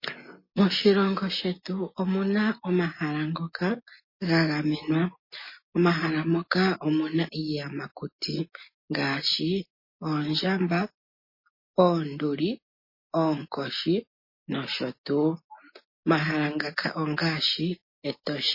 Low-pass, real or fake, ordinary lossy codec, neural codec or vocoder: 5.4 kHz; real; MP3, 24 kbps; none